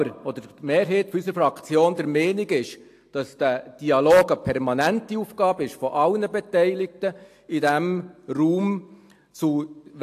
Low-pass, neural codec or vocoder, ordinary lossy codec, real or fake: 14.4 kHz; none; AAC, 64 kbps; real